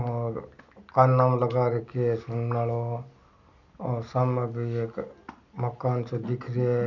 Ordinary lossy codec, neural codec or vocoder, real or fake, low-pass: none; none; real; 7.2 kHz